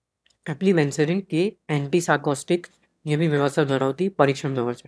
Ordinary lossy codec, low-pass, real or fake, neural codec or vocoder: none; none; fake; autoencoder, 22.05 kHz, a latent of 192 numbers a frame, VITS, trained on one speaker